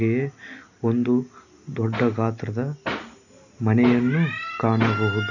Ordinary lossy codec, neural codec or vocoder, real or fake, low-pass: none; none; real; 7.2 kHz